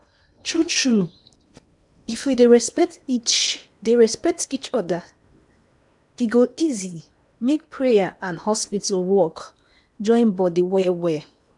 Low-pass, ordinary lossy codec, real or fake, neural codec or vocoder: 10.8 kHz; none; fake; codec, 16 kHz in and 24 kHz out, 0.8 kbps, FocalCodec, streaming, 65536 codes